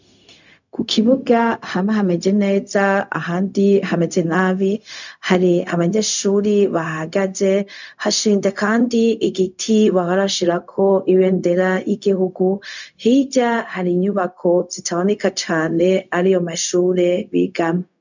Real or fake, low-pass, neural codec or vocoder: fake; 7.2 kHz; codec, 16 kHz, 0.4 kbps, LongCat-Audio-Codec